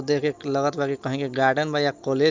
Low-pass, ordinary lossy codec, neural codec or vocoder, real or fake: 7.2 kHz; Opus, 24 kbps; none; real